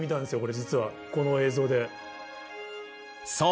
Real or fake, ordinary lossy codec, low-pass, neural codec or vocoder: real; none; none; none